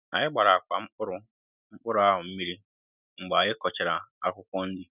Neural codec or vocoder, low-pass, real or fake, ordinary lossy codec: none; 3.6 kHz; real; none